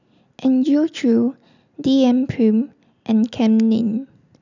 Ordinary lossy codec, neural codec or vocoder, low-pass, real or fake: none; none; 7.2 kHz; real